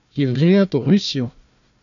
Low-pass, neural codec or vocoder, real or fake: 7.2 kHz; codec, 16 kHz, 1 kbps, FunCodec, trained on Chinese and English, 50 frames a second; fake